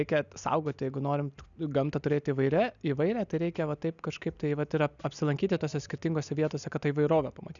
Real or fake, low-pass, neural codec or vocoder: real; 7.2 kHz; none